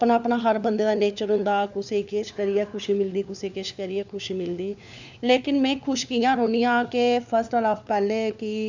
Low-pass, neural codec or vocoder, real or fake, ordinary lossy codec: 7.2 kHz; codec, 16 kHz, 4 kbps, FunCodec, trained on LibriTTS, 50 frames a second; fake; none